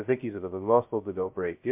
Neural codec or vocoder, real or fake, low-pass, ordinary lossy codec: codec, 16 kHz, 0.2 kbps, FocalCodec; fake; 3.6 kHz; MP3, 32 kbps